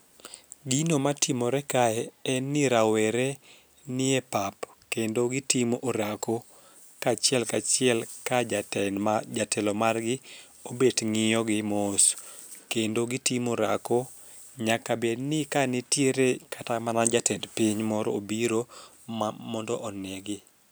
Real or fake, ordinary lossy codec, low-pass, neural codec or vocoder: real; none; none; none